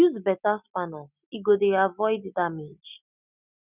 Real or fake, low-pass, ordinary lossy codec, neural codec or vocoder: real; 3.6 kHz; none; none